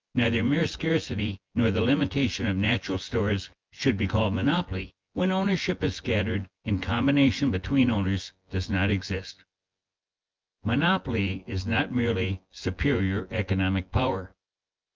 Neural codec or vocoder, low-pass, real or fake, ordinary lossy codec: vocoder, 24 kHz, 100 mel bands, Vocos; 7.2 kHz; fake; Opus, 24 kbps